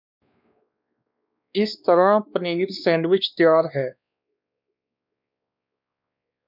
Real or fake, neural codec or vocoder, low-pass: fake; codec, 16 kHz, 2 kbps, X-Codec, WavLM features, trained on Multilingual LibriSpeech; 5.4 kHz